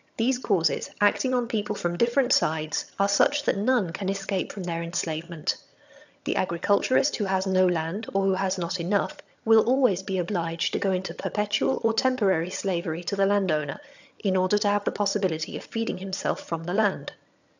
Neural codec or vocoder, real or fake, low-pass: vocoder, 22.05 kHz, 80 mel bands, HiFi-GAN; fake; 7.2 kHz